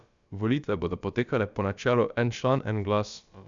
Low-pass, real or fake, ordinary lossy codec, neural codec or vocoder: 7.2 kHz; fake; Opus, 64 kbps; codec, 16 kHz, about 1 kbps, DyCAST, with the encoder's durations